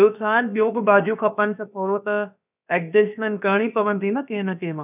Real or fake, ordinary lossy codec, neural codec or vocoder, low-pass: fake; none; codec, 16 kHz, about 1 kbps, DyCAST, with the encoder's durations; 3.6 kHz